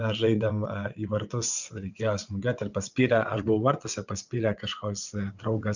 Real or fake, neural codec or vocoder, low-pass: fake; vocoder, 22.05 kHz, 80 mel bands, WaveNeXt; 7.2 kHz